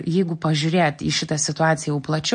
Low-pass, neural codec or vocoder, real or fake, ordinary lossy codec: 9.9 kHz; none; real; MP3, 48 kbps